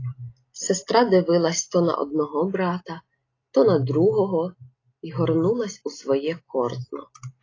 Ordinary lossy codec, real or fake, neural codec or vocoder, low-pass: AAC, 32 kbps; real; none; 7.2 kHz